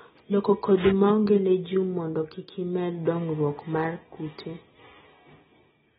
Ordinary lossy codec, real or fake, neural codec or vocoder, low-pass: AAC, 16 kbps; real; none; 19.8 kHz